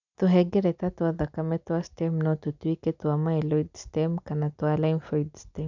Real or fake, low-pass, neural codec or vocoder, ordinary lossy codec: real; 7.2 kHz; none; none